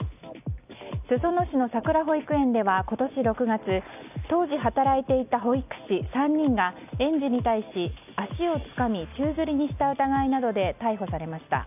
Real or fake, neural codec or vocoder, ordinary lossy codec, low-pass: real; none; none; 3.6 kHz